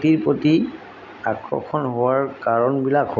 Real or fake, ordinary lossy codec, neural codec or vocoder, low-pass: real; none; none; 7.2 kHz